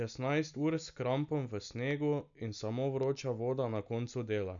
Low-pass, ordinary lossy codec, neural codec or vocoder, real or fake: 7.2 kHz; none; none; real